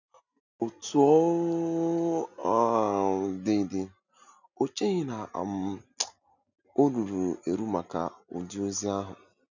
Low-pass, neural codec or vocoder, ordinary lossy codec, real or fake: 7.2 kHz; none; none; real